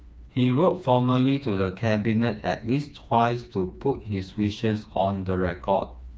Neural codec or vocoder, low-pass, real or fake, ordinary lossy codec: codec, 16 kHz, 2 kbps, FreqCodec, smaller model; none; fake; none